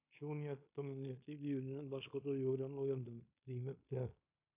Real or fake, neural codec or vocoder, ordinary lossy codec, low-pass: fake; codec, 16 kHz in and 24 kHz out, 0.9 kbps, LongCat-Audio-Codec, fine tuned four codebook decoder; AAC, 32 kbps; 3.6 kHz